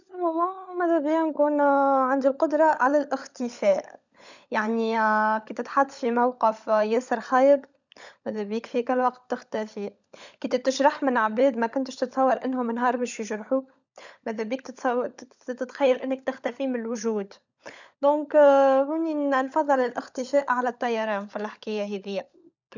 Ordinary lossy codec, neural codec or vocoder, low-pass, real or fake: none; codec, 16 kHz, 16 kbps, FunCodec, trained on LibriTTS, 50 frames a second; 7.2 kHz; fake